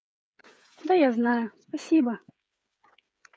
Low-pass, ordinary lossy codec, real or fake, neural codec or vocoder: none; none; fake; codec, 16 kHz, 8 kbps, FreqCodec, smaller model